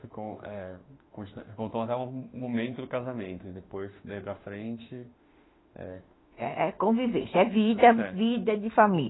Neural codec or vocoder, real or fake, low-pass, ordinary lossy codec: autoencoder, 48 kHz, 32 numbers a frame, DAC-VAE, trained on Japanese speech; fake; 7.2 kHz; AAC, 16 kbps